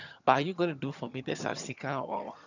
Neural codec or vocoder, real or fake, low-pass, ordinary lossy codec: vocoder, 22.05 kHz, 80 mel bands, HiFi-GAN; fake; 7.2 kHz; none